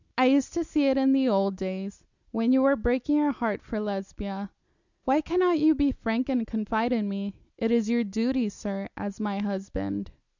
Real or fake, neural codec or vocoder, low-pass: real; none; 7.2 kHz